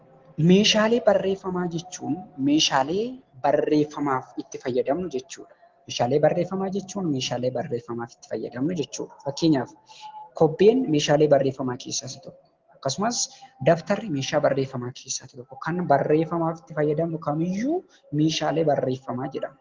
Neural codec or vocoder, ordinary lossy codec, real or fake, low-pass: none; Opus, 16 kbps; real; 7.2 kHz